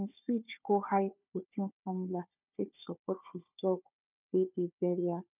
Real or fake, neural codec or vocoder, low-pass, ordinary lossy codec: fake; codec, 16 kHz, 4 kbps, FunCodec, trained on Chinese and English, 50 frames a second; 3.6 kHz; none